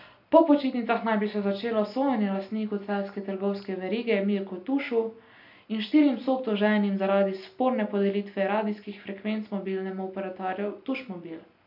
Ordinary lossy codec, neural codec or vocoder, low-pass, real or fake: AAC, 48 kbps; none; 5.4 kHz; real